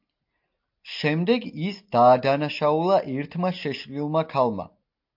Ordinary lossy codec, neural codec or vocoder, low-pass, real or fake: MP3, 48 kbps; none; 5.4 kHz; real